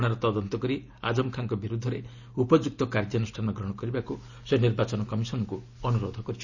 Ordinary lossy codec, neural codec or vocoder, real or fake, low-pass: none; none; real; 7.2 kHz